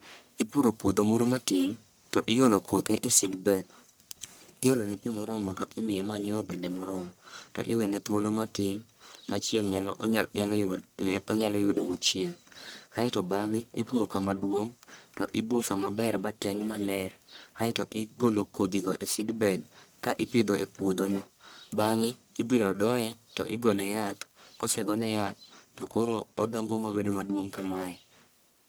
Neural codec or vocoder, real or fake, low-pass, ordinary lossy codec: codec, 44.1 kHz, 1.7 kbps, Pupu-Codec; fake; none; none